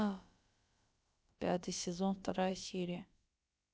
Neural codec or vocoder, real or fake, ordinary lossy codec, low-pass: codec, 16 kHz, about 1 kbps, DyCAST, with the encoder's durations; fake; none; none